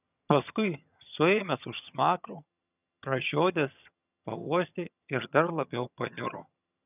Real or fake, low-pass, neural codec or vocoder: fake; 3.6 kHz; vocoder, 22.05 kHz, 80 mel bands, HiFi-GAN